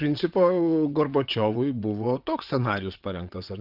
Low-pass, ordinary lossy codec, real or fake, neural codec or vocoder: 5.4 kHz; Opus, 24 kbps; fake; vocoder, 22.05 kHz, 80 mel bands, WaveNeXt